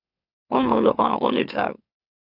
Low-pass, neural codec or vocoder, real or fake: 5.4 kHz; autoencoder, 44.1 kHz, a latent of 192 numbers a frame, MeloTTS; fake